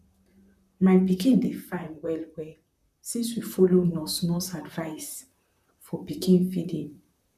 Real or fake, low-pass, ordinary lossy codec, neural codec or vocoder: fake; 14.4 kHz; none; vocoder, 44.1 kHz, 128 mel bands, Pupu-Vocoder